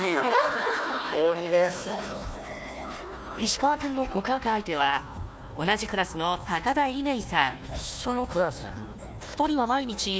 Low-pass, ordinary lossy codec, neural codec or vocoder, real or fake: none; none; codec, 16 kHz, 1 kbps, FunCodec, trained on Chinese and English, 50 frames a second; fake